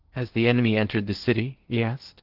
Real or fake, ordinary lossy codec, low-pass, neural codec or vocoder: fake; Opus, 16 kbps; 5.4 kHz; codec, 16 kHz in and 24 kHz out, 0.8 kbps, FocalCodec, streaming, 65536 codes